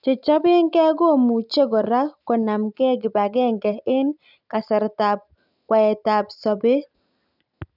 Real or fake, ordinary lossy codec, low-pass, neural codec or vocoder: real; none; 5.4 kHz; none